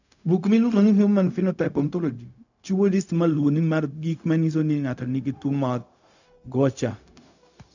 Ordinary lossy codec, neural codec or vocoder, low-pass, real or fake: none; codec, 16 kHz, 0.4 kbps, LongCat-Audio-Codec; 7.2 kHz; fake